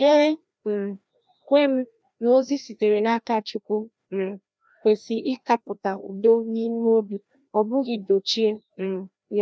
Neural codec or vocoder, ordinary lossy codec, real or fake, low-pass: codec, 16 kHz, 1 kbps, FreqCodec, larger model; none; fake; none